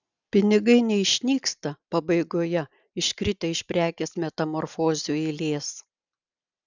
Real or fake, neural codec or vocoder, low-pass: real; none; 7.2 kHz